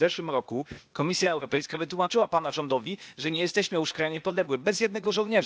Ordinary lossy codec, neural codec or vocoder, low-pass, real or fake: none; codec, 16 kHz, 0.8 kbps, ZipCodec; none; fake